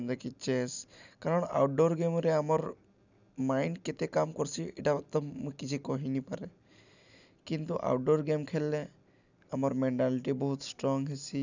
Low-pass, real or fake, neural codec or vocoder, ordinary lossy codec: 7.2 kHz; real; none; none